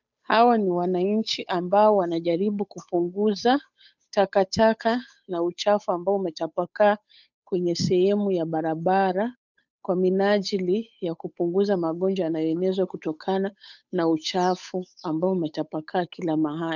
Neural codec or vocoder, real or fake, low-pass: codec, 16 kHz, 8 kbps, FunCodec, trained on Chinese and English, 25 frames a second; fake; 7.2 kHz